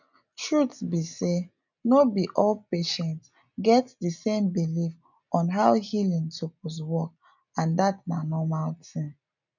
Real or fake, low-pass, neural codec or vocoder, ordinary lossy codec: real; 7.2 kHz; none; none